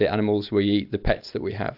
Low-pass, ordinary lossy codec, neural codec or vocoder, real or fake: 5.4 kHz; AAC, 48 kbps; none; real